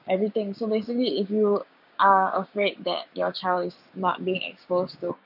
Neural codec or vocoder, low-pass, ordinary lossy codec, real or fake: codec, 16 kHz, 6 kbps, DAC; 5.4 kHz; none; fake